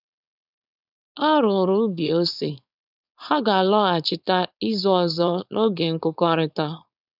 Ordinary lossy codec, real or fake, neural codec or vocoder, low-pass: none; fake; codec, 16 kHz, 4.8 kbps, FACodec; 5.4 kHz